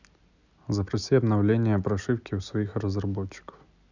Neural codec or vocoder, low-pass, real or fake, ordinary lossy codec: none; 7.2 kHz; real; none